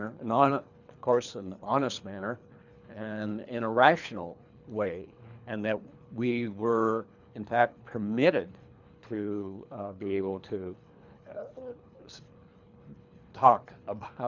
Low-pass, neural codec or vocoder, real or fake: 7.2 kHz; codec, 24 kHz, 3 kbps, HILCodec; fake